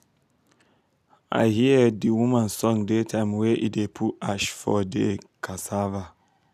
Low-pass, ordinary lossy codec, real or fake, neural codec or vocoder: 14.4 kHz; none; real; none